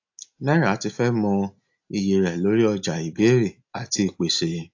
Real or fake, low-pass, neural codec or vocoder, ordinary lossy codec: real; 7.2 kHz; none; AAC, 48 kbps